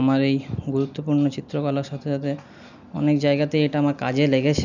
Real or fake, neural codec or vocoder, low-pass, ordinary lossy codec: real; none; 7.2 kHz; none